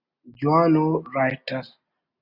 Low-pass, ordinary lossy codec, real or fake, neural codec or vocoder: 5.4 kHz; Opus, 64 kbps; real; none